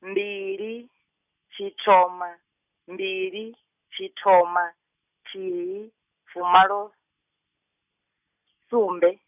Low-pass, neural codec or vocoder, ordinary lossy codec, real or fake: 3.6 kHz; none; none; real